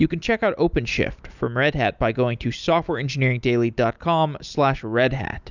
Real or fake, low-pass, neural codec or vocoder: real; 7.2 kHz; none